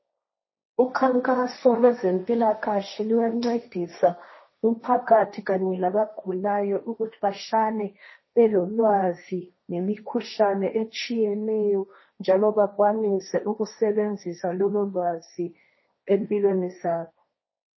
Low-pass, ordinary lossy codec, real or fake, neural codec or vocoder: 7.2 kHz; MP3, 24 kbps; fake; codec, 16 kHz, 1.1 kbps, Voila-Tokenizer